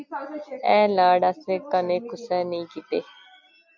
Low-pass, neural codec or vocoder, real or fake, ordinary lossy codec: 7.2 kHz; none; real; MP3, 64 kbps